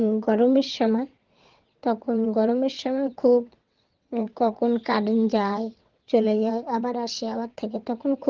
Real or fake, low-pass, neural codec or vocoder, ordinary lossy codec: fake; 7.2 kHz; vocoder, 22.05 kHz, 80 mel bands, WaveNeXt; Opus, 16 kbps